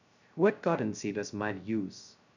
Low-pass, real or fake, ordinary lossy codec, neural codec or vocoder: 7.2 kHz; fake; none; codec, 16 kHz, 0.2 kbps, FocalCodec